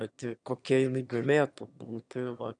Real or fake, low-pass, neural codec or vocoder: fake; 9.9 kHz; autoencoder, 22.05 kHz, a latent of 192 numbers a frame, VITS, trained on one speaker